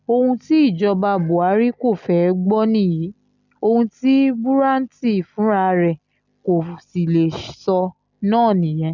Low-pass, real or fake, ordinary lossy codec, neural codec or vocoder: 7.2 kHz; real; none; none